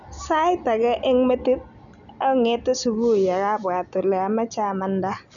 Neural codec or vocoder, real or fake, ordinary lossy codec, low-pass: none; real; none; 7.2 kHz